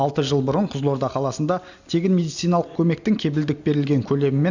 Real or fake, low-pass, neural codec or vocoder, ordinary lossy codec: real; 7.2 kHz; none; none